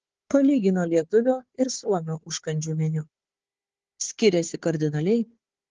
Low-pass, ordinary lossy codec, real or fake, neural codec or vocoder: 7.2 kHz; Opus, 16 kbps; fake; codec, 16 kHz, 4 kbps, FunCodec, trained on Chinese and English, 50 frames a second